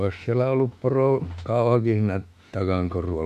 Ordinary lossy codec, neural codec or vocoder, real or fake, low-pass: none; autoencoder, 48 kHz, 32 numbers a frame, DAC-VAE, trained on Japanese speech; fake; 14.4 kHz